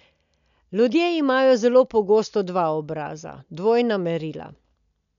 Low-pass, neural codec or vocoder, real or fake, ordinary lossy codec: 7.2 kHz; none; real; none